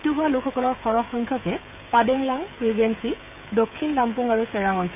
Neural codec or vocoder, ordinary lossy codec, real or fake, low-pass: codec, 16 kHz, 16 kbps, FreqCodec, smaller model; none; fake; 3.6 kHz